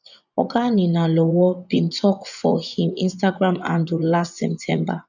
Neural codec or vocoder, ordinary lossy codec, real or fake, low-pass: vocoder, 24 kHz, 100 mel bands, Vocos; none; fake; 7.2 kHz